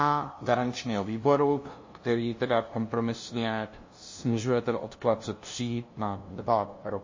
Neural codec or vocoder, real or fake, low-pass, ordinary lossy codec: codec, 16 kHz, 0.5 kbps, FunCodec, trained on LibriTTS, 25 frames a second; fake; 7.2 kHz; MP3, 32 kbps